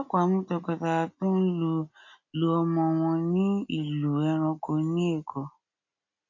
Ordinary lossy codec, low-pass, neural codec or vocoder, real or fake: AAC, 48 kbps; 7.2 kHz; none; real